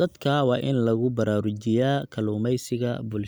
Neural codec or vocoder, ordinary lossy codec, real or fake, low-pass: none; none; real; none